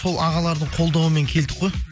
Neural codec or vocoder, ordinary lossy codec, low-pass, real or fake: none; none; none; real